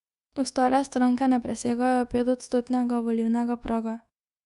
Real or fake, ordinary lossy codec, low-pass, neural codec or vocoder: fake; Opus, 64 kbps; 10.8 kHz; codec, 24 kHz, 1.2 kbps, DualCodec